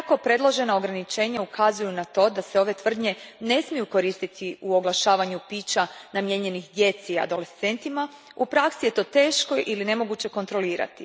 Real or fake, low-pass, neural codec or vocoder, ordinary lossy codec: real; none; none; none